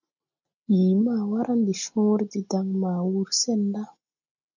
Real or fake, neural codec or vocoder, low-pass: real; none; 7.2 kHz